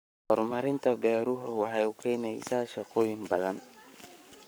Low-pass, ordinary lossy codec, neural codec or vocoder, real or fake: none; none; codec, 44.1 kHz, 7.8 kbps, Pupu-Codec; fake